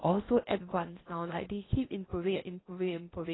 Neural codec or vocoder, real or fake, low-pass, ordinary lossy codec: codec, 16 kHz in and 24 kHz out, 0.8 kbps, FocalCodec, streaming, 65536 codes; fake; 7.2 kHz; AAC, 16 kbps